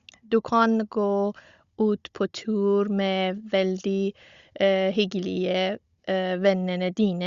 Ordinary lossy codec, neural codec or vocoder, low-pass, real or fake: Opus, 64 kbps; codec, 16 kHz, 16 kbps, FunCodec, trained on Chinese and English, 50 frames a second; 7.2 kHz; fake